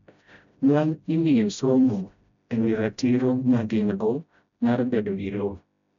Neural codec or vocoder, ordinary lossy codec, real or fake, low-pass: codec, 16 kHz, 0.5 kbps, FreqCodec, smaller model; none; fake; 7.2 kHz